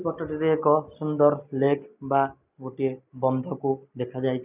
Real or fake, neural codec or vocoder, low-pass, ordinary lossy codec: real; none; 3.6 kHz; none